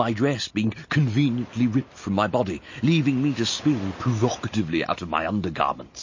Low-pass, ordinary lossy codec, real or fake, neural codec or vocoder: 7.2 kHz; MP3, 32 kbps; real; none